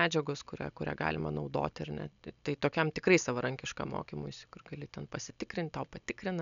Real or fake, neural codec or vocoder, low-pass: real; none; 7.2 kHz